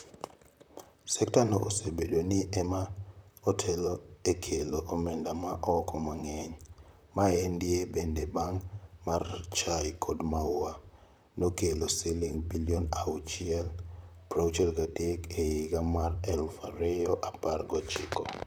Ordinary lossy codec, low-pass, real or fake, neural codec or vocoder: none; none; fake; vocoder, 44.1 kHz, 128 mel bands, Pupu-Vocoder